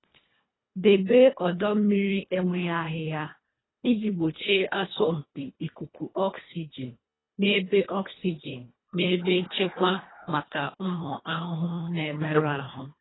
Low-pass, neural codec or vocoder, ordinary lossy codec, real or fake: 7.2 kHz; codec, 24 kHz, 1.5 kbps, HILCodec; AAC, 16 kbps; fake